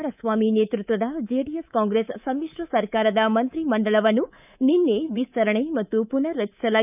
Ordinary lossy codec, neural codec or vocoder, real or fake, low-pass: none; codec, 24 kHz, 3.1 kbps, DualCodec; fake; 3.6 kHz